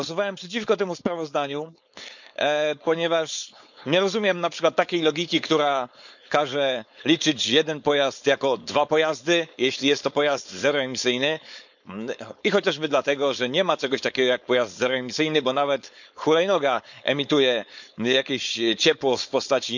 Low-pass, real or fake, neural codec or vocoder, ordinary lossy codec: 7.2 kHz; fake; codec, 16 kHz, 4.8 kbps, FACodec; none